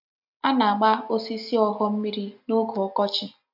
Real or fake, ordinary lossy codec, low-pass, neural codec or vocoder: real; AAC, 48 kbps; 5.4 kHz; none